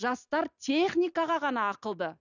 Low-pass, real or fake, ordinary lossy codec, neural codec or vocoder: 7.2 kHz; real; none; none